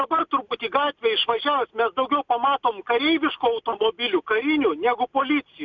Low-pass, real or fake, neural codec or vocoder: 7.2 kHz; real; none